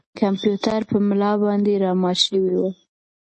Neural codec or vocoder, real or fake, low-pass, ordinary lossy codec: none; real; 10.8 kHz; MP3, 32 kbps